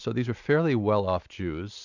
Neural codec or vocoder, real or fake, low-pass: none; real; 7.2 kHz